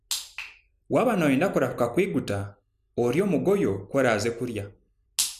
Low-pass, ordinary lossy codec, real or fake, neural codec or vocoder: 14.4 kHz; none; real; none